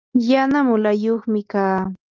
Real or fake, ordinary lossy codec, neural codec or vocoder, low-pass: real; Opus, 24 kbps; none; 7.2 kHz